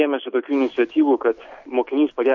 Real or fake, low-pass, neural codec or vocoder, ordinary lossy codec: real; 7.2 kHz; none; MP3, 48 kbps